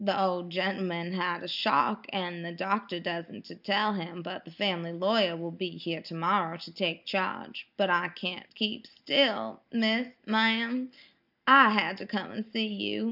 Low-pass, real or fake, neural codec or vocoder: 5.4 kHz; real; none